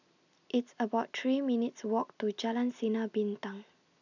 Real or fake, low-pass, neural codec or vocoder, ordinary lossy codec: real; 7.2 kHz; none; none